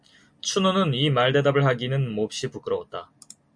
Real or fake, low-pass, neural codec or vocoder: real; 9.9 kHz; none